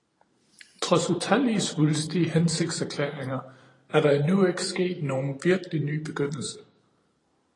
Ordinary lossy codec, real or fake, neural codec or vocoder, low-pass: AAC, 32 kbps; fake; vocoder, 44.1 kHz, 128 mel bands every 512 samples, BigVGAN v2; 10.8 kHz